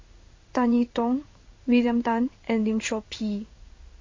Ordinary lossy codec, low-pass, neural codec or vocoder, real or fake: MP3, 32 kbps; 7.2 kHz; codec, 16 kHz in and 24 kHz out, 1 kbps, XY-Tokenizer; fake